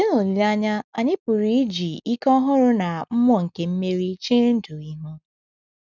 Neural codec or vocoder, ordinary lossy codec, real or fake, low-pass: none; none; real; 7.2 kHz